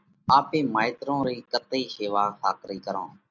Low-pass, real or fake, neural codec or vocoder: 7.2 kHz; real; none